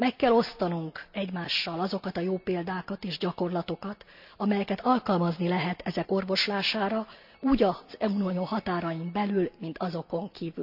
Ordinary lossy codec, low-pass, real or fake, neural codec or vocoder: none; 5.4 kHz; real; none